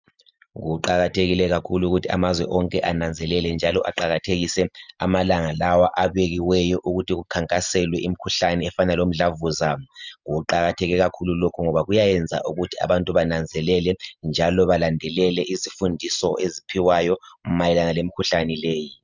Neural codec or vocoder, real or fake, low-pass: none; real; 7.2 kHz